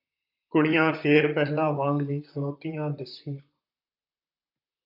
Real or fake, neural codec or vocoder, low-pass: fake; vocoder, 44.1 kHz, 128 mel bands, Pupu-Vocoder; 5.4 kHz